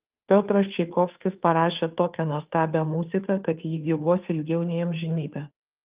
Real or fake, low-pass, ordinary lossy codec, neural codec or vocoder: fake; 3.6 kHz; Opus, 24 kbps; codec, 16 kHz, 2 kbps, FunCodec, trained on Chinese and English, 25 frames a second